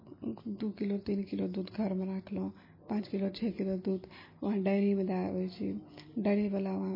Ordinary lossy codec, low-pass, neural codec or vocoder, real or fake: MP3, 24 kbps; 5.4 kHz; none; real